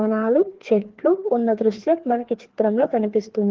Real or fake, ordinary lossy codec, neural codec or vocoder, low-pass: fake; Opus, 16 kbps; codec, 16 kHz, 2 kbps, FreqCodec, larger model; 7.2 kHz